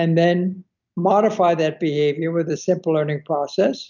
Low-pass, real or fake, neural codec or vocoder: 7.2 kHz; real; none